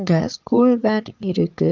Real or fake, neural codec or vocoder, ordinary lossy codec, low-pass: fake; vocoder, 22.05 kHz, 80 mel bands, Vocos; Opus, 24 kbps; 7.2 kHz